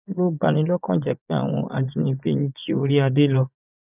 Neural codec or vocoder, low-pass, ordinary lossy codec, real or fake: vocoder, 24 kHz, 100 mel bands, Vocos; 3.6 kHz; none; fake